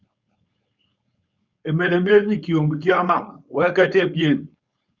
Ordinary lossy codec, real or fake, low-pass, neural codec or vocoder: Opus, 64 kbps; fake; 7.2 kHz; codec, 16 kHz, 4.8 kbps, FACodec